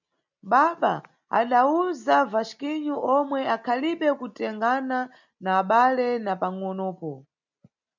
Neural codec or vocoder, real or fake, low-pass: none; real; 7.2 kHz